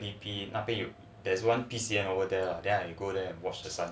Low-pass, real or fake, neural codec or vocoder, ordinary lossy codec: none; real; none; none